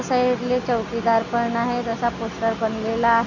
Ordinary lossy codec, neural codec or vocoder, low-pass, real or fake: none; none; 7.2 kHz; real